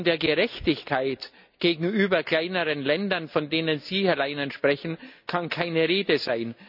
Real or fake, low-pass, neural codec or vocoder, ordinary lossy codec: real; 5.4 kHz; none; none